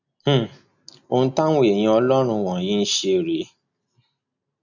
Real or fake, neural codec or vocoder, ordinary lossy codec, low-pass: real; none; none; 7.2 kHz